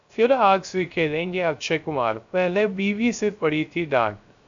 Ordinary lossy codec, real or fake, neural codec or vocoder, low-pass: AAC, 64 kbps; fake; codec, 16 kHz, 0.3 kbps, FocalCodec; 7.2 kHz